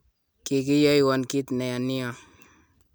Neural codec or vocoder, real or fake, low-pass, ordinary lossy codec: none; real; none; none